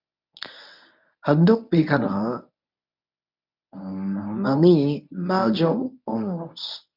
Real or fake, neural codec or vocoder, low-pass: fake; codec, 24 kHz, 0.9 kbps, WavTokenizer, medium speech release version 1; 5.4 kHz